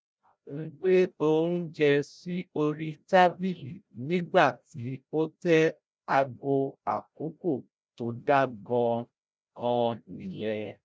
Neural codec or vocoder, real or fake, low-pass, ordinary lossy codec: codec, 16 kHz, 0.5 kbps, FreqCodec, larger model; fake; none; none